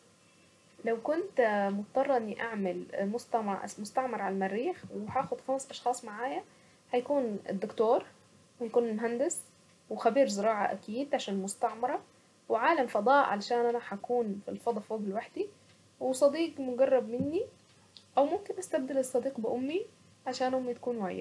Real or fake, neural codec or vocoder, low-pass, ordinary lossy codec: real; none; none; none